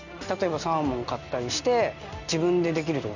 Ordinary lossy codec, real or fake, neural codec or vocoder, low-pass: none; real; none; 7.2 kHz